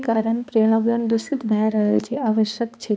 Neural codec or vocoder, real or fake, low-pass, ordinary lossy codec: codec, 16 kHz, 2 kbps, X-Codec, HuBERT features, trained on balanced general audio; fake; none; none